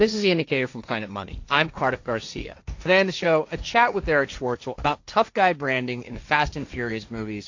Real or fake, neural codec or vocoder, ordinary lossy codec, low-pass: fake; codec, 16 kHz, 1.1 kbps, Voila-Tokenizer; AAC, 48 kbps; 7.2 kHz